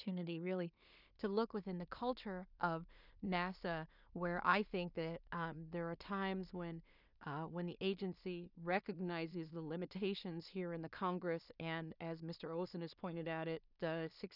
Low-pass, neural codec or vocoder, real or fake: 5.4 kHz; codec, 16 kHz in and 24 kHz out, 0.4 kbps, LongCat-Audio-Codec, two codebook decoder; fake